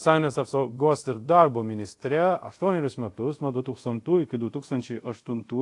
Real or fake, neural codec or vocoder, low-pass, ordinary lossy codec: fake; codec, 24 kHz, 0.5 kbps, DualCodec; 10.8 kHz; AAC, 48 kbps